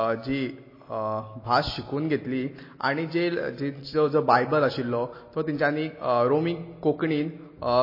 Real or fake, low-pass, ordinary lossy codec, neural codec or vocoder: real; 5.4 kHz; MP3, 24 kbps; none